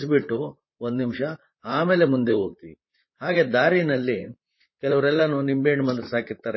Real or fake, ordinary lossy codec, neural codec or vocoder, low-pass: fake; MP3, 24 kbps; vocoder, 44.1 kHz, 128 mel bands, Pupu-Vocoder; 7.2 kHz